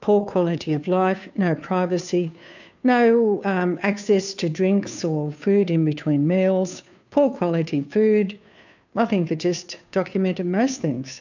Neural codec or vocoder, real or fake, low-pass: codec, 16 kHz, 2 kbps, FunCodec, trained on Chinese and English, 25 frames a second; fake; 7.2 kHz